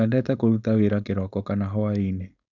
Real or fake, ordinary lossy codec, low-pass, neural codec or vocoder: fake; none; 7.2 kHz; codec, 16 kHz, 4.8 kbps, FACodec